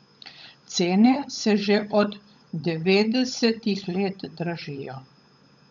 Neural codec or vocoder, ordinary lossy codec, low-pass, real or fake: codec, 16 kHz, 16 kbps, FunCodec, trained on LibriTTS, 50 frames a second; none; 7.2 kHz; fake